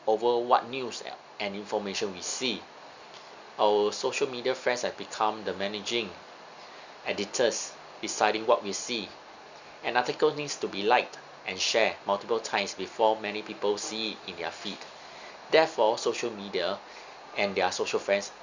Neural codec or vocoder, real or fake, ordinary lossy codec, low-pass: none; real; Opus, 64 kbps; 7.2 kHz